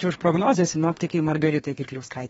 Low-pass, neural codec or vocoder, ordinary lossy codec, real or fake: 14.4 kHz; codec, 32 kHz, 1.9 kbps, SNAC; AAC, 24 kbps; fake